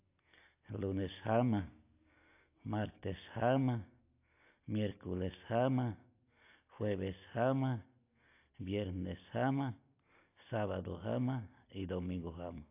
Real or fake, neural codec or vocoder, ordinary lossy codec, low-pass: real; none; AAC, 24 kbps; 3.6 kHz